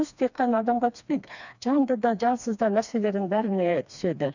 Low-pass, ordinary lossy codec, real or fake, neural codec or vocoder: 7.2 kHz; none; fake; codec, 16 kHz, 2 kbps, FreqCodec, smaller model